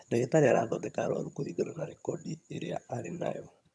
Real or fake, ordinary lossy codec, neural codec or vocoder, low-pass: fake; none; vocoder, 22.05 kHz, 80 mel bands, HiFi-GAN; none